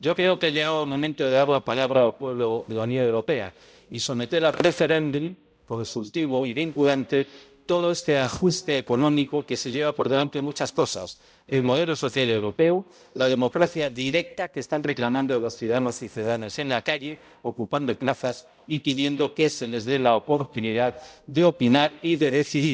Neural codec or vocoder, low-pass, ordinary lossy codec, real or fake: codec, 16 kHz, 0.5 kbps, X-Codec, HuBERT features, trained on balanced general audio; none; none; fake